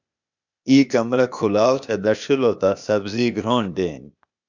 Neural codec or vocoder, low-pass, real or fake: codec, 16 kHz, 0.8 kbps, ZipCodec; 7.2 kHz; fake